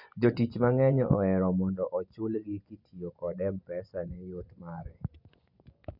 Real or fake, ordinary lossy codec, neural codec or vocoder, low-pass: fake; none; vocoder, 24 kHz, 100 mel bands, Vocos; 5.4 kHz